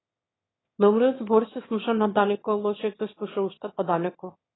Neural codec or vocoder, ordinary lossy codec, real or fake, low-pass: autoencoder, 22.05 kHz, a latent of 192 numbers a frame, VITS, trained on one speaker; AAC, 16 kbps; fake; 7.2 kHz